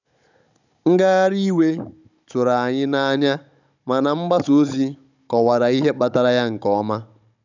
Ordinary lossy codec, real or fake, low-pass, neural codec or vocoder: none; fake; 7.2 kHz; codec, 16 kHz, 16 kbps, FunCodec, trained on Chinese and English, 50 frames a second